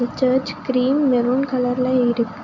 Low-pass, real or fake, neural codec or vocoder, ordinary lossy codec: 7.2 kHz; real; none; none